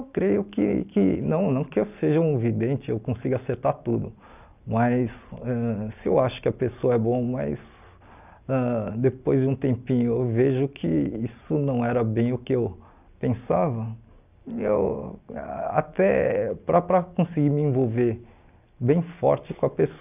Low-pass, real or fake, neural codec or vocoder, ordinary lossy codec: 3.6 kHz; real; none; none